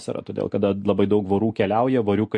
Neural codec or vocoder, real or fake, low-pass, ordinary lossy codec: none; real; 10.8 kHz; MP3, 48 kbps